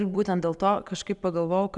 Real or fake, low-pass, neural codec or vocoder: real; 10.8 kHz; none